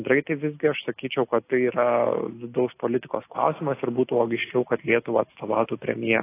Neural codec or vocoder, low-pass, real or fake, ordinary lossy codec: none; 3.6 kHz; real; AAC, 24 kbps